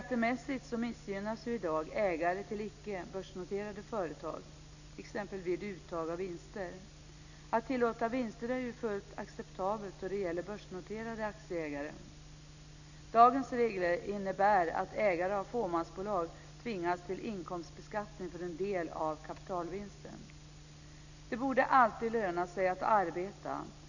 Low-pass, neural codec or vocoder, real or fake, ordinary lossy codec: 7.2 kHz; none; real; none